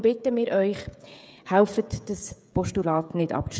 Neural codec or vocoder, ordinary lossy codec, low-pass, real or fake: codec, 16 kHz, 16 kbps, FreqCodec, smaller model; none; none; fake